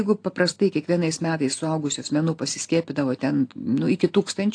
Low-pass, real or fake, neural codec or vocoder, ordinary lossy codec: 9.9 kHz; real; none; AAC, 48 kbps